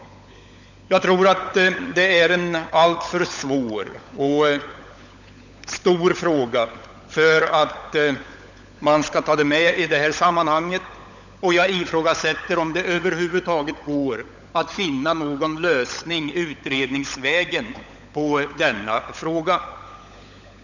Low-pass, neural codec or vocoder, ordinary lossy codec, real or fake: 7.2 kHz; codec, 16 kHz, 16 kbps, FunCodec, trained on LibriTTS, 50 frames a second; none; fake